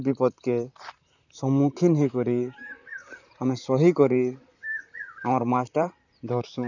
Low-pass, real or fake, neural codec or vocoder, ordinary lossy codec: 7.2 kHz; fake; vocoder, 44.1 kHz, 128 mel bands, Pupu-Vocoder; none